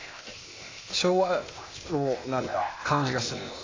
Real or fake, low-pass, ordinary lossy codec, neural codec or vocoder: fake; 7.2 kHz; AAC, 32 kbps; codec, 16 kHz, 0.8 kbps, ZipCodec